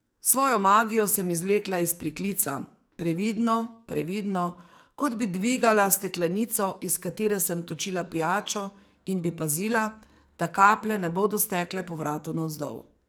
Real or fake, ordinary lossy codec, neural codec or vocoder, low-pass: fake; none; codec, 44.1 kHz, 2.6 kbps, SNAC; none